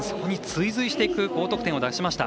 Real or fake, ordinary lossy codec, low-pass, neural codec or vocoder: real; none; none; none